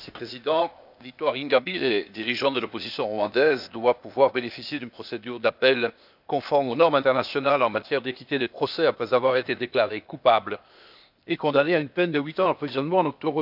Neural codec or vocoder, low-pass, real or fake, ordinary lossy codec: codec, 16 kHz, 0.8 kbps, ZipCodec; 5.4 kHz; fake; none